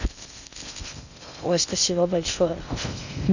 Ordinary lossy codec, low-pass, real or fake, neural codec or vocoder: none; 7.2 kHz; fake; codec, 16 kHz in and 24 kHz out, 0.6 kbps, FocalCodec, streaming, 2048 codes